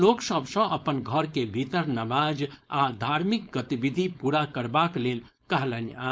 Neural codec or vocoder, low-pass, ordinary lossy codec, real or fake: codec, 16 kHz, 4.8 kbps, FACodec; none; none; fake